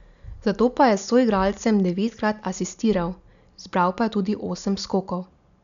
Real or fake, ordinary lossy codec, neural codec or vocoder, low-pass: real; none; none; 7.2 kHz